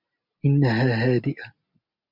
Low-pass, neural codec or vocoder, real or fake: 5.4 kHz; none; real